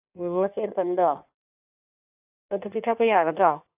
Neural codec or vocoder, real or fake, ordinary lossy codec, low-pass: codec, 16 kHz in and 24 kHz out, 1.1 kbps, FireRedTTS-2 codec; fake; AAC, 32 kbps; 3.6 kHz